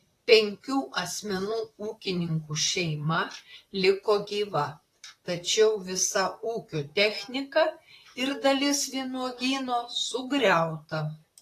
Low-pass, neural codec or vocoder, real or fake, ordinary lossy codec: 14.4 kHz; vocoder, 44.1 kHz, 128 mel bands, Pupu-Vocoder; fake; AAC, 48 kbps